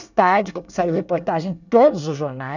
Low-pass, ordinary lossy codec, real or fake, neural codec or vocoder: 7.2 kHz; none; fake; codec, 24 kHz, 1 kbps, SNAC